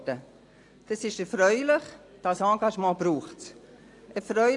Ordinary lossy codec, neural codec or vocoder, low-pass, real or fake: AAC, 48 kbps; none; 10.8 kHz; real